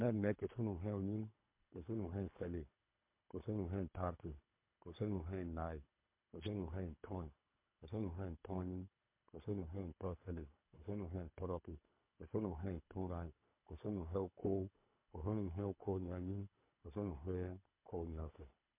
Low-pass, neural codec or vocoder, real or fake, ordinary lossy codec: 3.6 kHz; codec, 24 kHz, 3 kbps, HILCodec; fake; MP3, 32 kbps